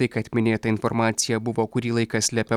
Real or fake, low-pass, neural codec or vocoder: real; 19.8 kHz; none